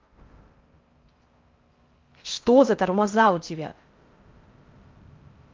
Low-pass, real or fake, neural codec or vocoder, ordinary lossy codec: 7.2 kHz; fake; codec, 16 kHz in and 24 kHz out, 0.6 kbps, FocalCodec, streaming, 4096 codes; Opus, 24 kbps